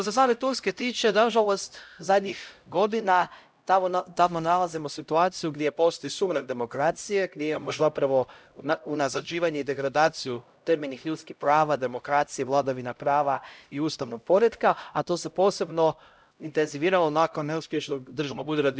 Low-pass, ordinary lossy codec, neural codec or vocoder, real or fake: none; none; codec, 16 kHz, 0.5 kbps, X-Codec, HuBERT features, trained on LibriSpeech; fake